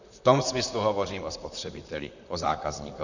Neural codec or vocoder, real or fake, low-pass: vocoder, 44.1 kHz, 128 mel bands, Pupu-Vocoder; fake; 7.2 kHz